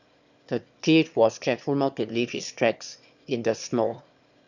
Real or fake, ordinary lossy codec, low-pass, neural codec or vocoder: fake; none; 7.2 kHz; autoencoder, 22.05 kHz, a latent of 192 numbers a frame, VITS, trained on one speaker